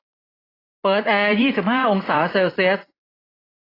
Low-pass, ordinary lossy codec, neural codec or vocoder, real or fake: 5.4 kHz; AAC, 24 kbps; vocoder, 44.1 kHz, 128 mel bands every 256 samples, BigVGAN v2; fake